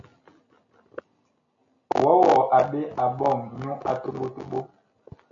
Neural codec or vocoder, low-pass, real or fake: none; 7.2 kHz; real